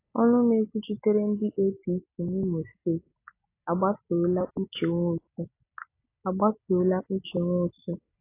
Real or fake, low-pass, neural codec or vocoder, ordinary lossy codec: real; 3.6 kHz; none; AAC, 24 kbps